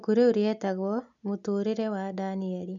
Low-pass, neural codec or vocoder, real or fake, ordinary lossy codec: 7.2 kHz; none; real; none